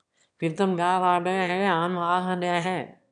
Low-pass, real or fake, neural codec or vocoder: 9.9 kHz; fake; autoencoder, 22.05 kHz, a latent of 192 numbers a frame, VITS, trained on one speaker